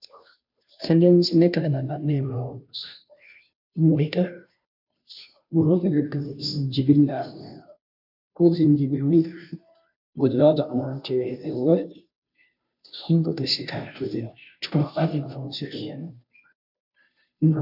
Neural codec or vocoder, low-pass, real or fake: codec, 16 kHz, 0.5 kbps, FunCodec, trained on Chinese and English, 25 frames a second; 5.4 kHz; fake